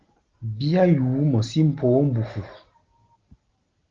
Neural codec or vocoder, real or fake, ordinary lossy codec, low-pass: none; real; Opus, 16 kbps; 7.2 kHz